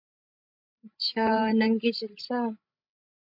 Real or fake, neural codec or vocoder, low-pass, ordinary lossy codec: fake; codec, 16 kHz, 16 kbps, FreqCodec, larger model; 5.4 kHz; AAC, 48 kbps